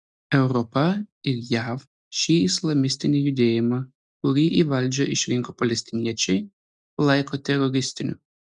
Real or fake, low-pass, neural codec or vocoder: real; 9.9 kHz; none